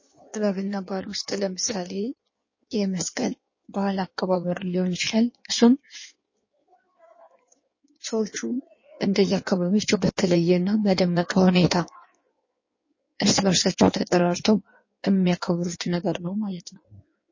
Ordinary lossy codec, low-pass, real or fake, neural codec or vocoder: MP3, 32 kbps; 7.2 kHz; fake; codec, 16 kHz in and 24 kHz out, 1.1 kbps, FireRedTTS-2 codec